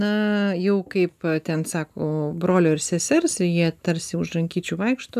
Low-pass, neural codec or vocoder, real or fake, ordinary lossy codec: 14.4 kHz; none; real; AAC, 96 kbps